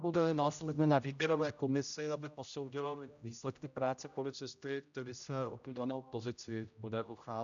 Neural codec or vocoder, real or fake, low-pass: codec, 16 kHz, 0.5 kbps, X-Codec, HuBERT features, trained on general audio; fake; 7.2 kHz